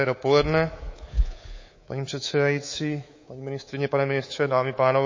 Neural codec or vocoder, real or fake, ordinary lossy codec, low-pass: codec, 24 kHz, 3.1 kbps, DualCodec; fake; MP3, 32 kbps; 7.2 kHz